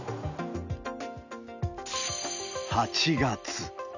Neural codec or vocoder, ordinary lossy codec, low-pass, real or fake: none; none; 7.2 kHz; real